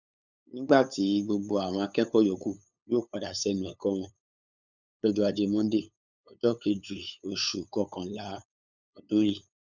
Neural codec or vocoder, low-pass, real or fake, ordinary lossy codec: codec, 16 kHz, 8 kbps, FunCodec, trained on LibriTTS, 25 frames a second; 7.2 kHz; fake; none